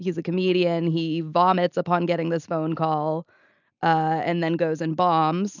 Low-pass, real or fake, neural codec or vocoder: 7.2 kHz; real; none